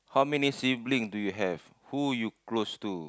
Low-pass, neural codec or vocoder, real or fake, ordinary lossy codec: none; none; real; none